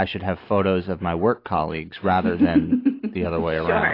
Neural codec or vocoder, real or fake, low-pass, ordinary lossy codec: none; real; 5.4 kHz; AAC, 32 kbps